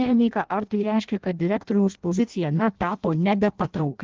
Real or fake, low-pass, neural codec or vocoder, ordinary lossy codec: fake; 7.2 kHz; codec, 16 kHz in and 24 kHz out, 0.6 kbps, FireRedTTS-2 codec; Opus, 16 kbps